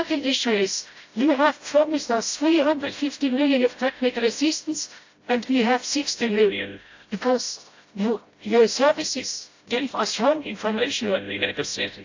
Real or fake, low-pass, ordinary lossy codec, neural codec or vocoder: fake; 7.2 kHz; AAC, 48 kbps; codec, 16 kHz, 0.5 kbps, FreqCodec, smaller model